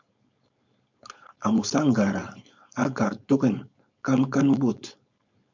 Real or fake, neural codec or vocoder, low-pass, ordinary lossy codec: fake; codec, 16 kHz, 4.8 kbps, FACodec; 7.2 kHz; MP3, 64 kbps